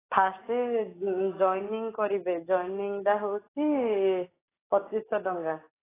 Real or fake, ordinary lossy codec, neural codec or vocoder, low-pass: real; AAC, 16 kbps; none; 3.6 kHz